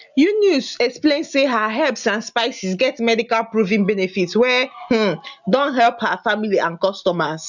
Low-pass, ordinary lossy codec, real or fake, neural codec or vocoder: 7.2 kHz; none; real; none